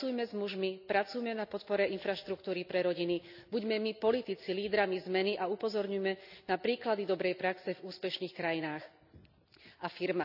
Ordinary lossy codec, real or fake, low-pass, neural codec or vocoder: none; real; 5.4 kHz; none